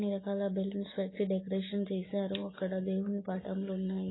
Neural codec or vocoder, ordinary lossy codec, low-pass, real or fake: none; AAC, 16 kbps; 7.2 kHz; real